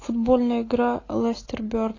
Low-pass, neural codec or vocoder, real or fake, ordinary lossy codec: 7.2 kHz; none; real; AAC, 32 kbps